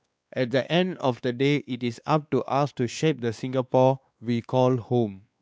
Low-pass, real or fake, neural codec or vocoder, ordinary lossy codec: none; fake; codec, 16 kHz, 2 kbps, X-Codec, WavLM features, trained on Multilingual LibriSpeech; none